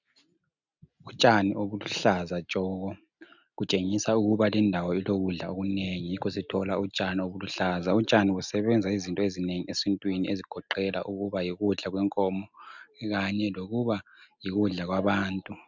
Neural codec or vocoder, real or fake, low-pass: none; real; 7.2 kHz